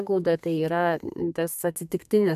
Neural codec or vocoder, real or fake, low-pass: codec, 32 kHz, 1.9 kbps, SNAC; fake; 14.4 kHz